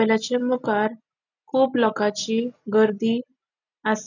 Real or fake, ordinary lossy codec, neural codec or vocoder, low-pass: real; none; none; 7.2 kHz